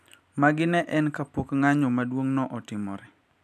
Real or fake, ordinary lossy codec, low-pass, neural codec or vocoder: fake; none; 14.4 kHz; vocoder, 44.1 kHz, 128 mel bands every 256 samples, BigVGAN v2